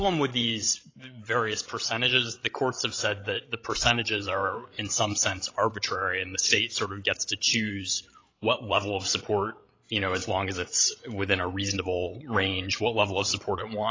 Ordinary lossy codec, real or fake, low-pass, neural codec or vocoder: AAC, 32 kbps; fake; 7.2 kHz; codec, 16 kHz, 16 kbps, FreqCodec, larger model